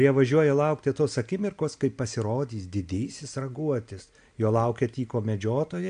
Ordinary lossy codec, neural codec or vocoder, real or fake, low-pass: MP3, 64 kbps; none; real; 9.9 kHz